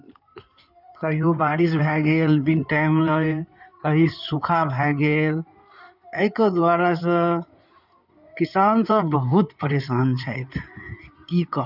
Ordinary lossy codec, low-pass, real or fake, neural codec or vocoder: none; 5.4 kHz; fake; codec, 16 kHz in and 24 kHz out, 2.2 kbps, FireRedTTS-2 codec